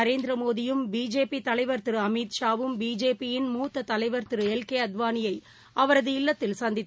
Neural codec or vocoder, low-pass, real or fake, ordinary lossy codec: none; none; real; none